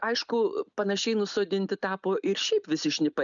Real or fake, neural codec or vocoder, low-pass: real; none; 7.2 kHz